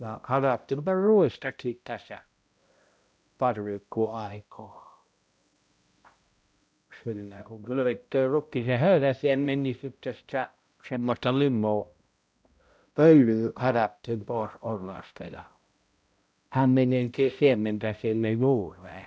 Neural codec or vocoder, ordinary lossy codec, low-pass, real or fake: codec, 16 kHz, 0.5 kbps, X-Codec, HuBERT features, trained on balanced general audio; none; none; fake